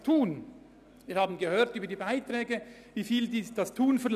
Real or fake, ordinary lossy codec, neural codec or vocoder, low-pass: real; none; none; 14.4 kHz